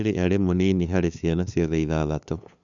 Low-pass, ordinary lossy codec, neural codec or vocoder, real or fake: 7.2 kHz; none; codec, 16 kHz, 2 kbps, FunCodec, trained on LibriTTS, 25 frames a second; fake